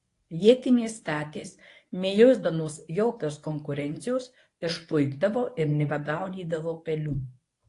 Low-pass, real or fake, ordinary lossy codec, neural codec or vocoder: 10.8 kHz; fake; AAC, 48 kbps; codec, 24 kHz, 0.9 kbps, WavTokenizer, medium speech release version 1